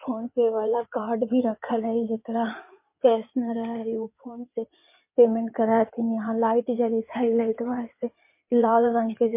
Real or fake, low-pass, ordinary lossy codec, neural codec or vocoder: fake; 3.6 kHz; MP3, 24 kbps; codec, 16 kHz in and 24 kHz out, 2.2 kbps, FireRedTTS-2 codec